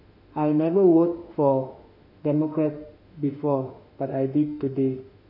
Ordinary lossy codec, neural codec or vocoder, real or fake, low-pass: none; autoencoder, 48 kHz, 32 numbers a frame, DAC-VAE, trained on Japanese speech; fake; 5.4 kHz